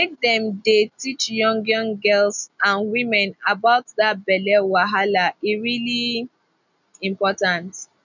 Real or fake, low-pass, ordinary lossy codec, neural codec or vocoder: real; 7.2 kHz; none; none